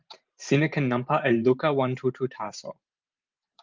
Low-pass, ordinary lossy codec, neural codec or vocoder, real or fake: 7.2 kHz; Opus, 32 kbps; vocoder, 44.1 kHz, 128 mel bands every 512 samples, BigVGAN v2; fake